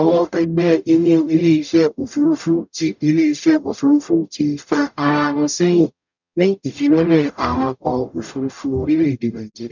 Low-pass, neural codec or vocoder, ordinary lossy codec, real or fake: 7.2 kHz; codec, 44.1 kHz, 0.9 kbps, DAC; none; fake